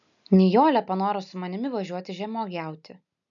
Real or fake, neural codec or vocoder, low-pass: real; none; 7.2 kHz